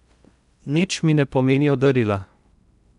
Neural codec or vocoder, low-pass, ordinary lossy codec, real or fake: codec, 16 kHz in and 24 kHz out, 0.8 kbps, FocalCodec, streaming, 65536 codes; 10.8 kHz; none; fake